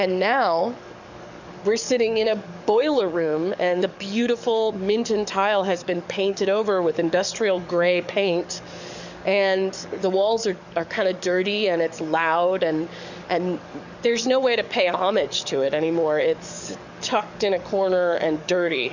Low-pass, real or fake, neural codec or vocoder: 7.2 kHz; fake; codec, 44.1 kHz, 7.8 kbps, DAC